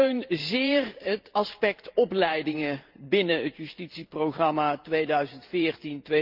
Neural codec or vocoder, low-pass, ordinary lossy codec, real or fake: vocoder, 44.1 kHz, 128 mel bands every 512 samples, BigVGAN v2; 5.4 kHz; Opus, 24 kbps; fake